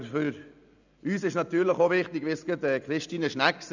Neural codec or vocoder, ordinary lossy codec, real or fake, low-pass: none; none; real; 7.2 kHz